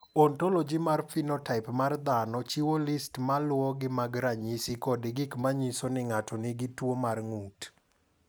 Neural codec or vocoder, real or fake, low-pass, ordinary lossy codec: none; real; none; none